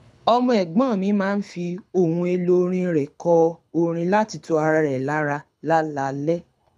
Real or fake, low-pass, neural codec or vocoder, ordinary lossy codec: fake; none; codec, 24 kHz, 6 kbps, HILCodec; none